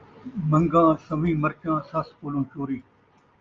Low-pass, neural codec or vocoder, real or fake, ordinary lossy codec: 7.2 kHz; none; real; Opus, 32 kbps